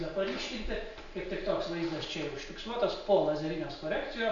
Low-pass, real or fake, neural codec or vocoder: 7.2 kHz; real; none